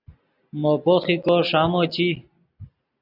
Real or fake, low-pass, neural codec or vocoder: real; 5.4 kHz; none